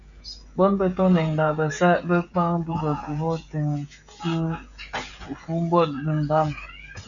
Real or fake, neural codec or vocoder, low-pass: fake; codec, 16 kHz, 16 kbps, FreqCodec, smaller model; 7.2 kHz